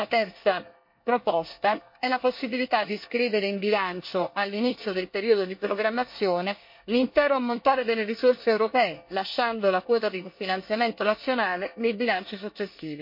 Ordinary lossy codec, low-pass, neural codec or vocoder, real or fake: MP3, 32 kbps; 5.4 kHz; codec, 24 kHz, 1 kbps, SNAC; fake